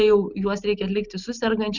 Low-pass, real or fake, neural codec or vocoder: 7.2 kHz; real; none